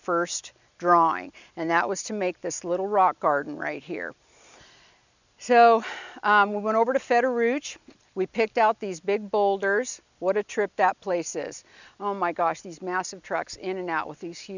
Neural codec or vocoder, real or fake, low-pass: none; real; 7.2 kHz